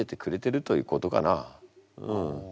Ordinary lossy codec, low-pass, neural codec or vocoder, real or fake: none; none; none; real